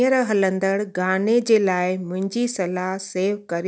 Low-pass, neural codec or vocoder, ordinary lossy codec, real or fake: none; none; none; real